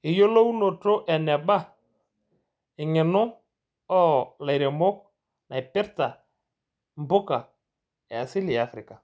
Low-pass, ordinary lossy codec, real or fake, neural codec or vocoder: none; none; real; none